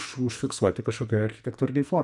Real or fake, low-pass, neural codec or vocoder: fake; 10.8 kHz; codec, 44.1 kHz, 2.6 kbps, SNAC